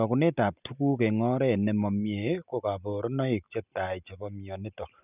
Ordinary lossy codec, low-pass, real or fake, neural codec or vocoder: none; 3.6 kHz; real; none